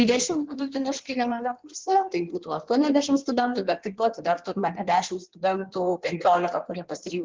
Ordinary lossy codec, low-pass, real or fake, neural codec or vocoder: Opus, 16 kbps; 7.2 kHz; fake; codec, 16 kHz in and 24 kHz out, 1.1 kbps, FireRedTTS-2 codec